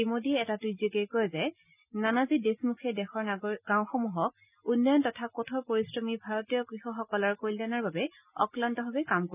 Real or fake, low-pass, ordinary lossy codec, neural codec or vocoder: real; 3.6 kHz; none; none